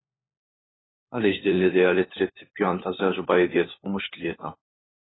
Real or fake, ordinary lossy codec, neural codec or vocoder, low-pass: fake; AAC, 16 kbps; codec, 16 kHz, 4 kbps, FunCodec, trained on LibriTTS, 50 frames a second; 7.2 kHz